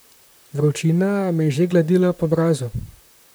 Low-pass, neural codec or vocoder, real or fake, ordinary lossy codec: none; vocoder, 44.1 kHz, 128 mel bands, Pupu-Vocoder; fake; none